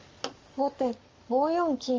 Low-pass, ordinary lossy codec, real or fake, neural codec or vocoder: 7.2 kHz; Opus, 32 kbps; fake; codec, 44.1 kHz, 7.8 kbps, Pupu-Codec